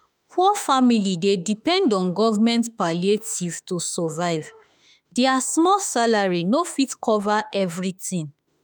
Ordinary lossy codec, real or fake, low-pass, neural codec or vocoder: none; fake; none; autoencoder, 48 kHz, 32 numbers a frame, DAC-VAE, trained on Japanese speech